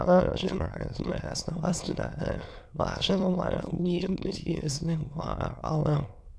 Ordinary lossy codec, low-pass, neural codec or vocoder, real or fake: none; none; autoencoder, 22.05 kHz, a latent of 192 numbers a frame, VITS, trained on many speakers; fake